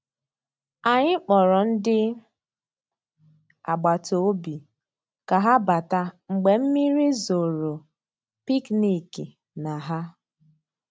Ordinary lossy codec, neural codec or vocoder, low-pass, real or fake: none; none; none; real